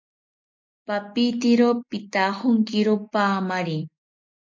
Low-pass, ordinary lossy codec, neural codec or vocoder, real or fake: 7.2 kHz; MP3, 48 kbps; none; real